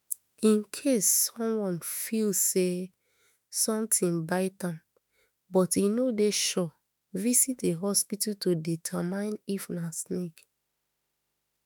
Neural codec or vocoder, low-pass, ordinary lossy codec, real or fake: autoencoder, 48 kHz, 32 numbers a frame, DAC-VAE, trained on Japanese speech; none; none; fake